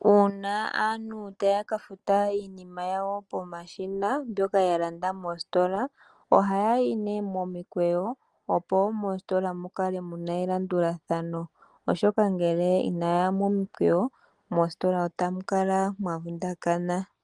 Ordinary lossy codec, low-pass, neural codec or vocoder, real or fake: Opus, 24 kbps; 10.8 kHz; none; real